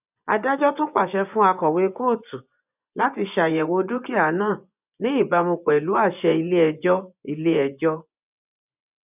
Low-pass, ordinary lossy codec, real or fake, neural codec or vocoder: 3.6 kHz; none; fake; vocoder, 24 kHz, 100 mel bands, Vocos